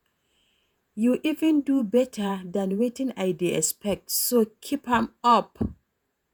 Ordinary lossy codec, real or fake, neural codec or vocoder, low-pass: none; fake; vocoder, 48 kHz, 128 mel bands, Vocos; none